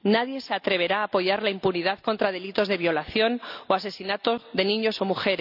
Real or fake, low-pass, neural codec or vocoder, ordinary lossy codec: real; 5.4 kHz; none; none